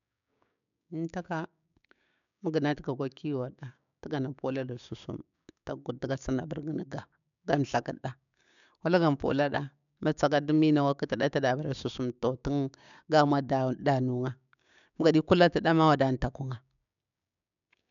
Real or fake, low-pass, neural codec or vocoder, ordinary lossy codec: fake; 7.2 kHz; codec, 16 kHz, 6 kbps, DAC; none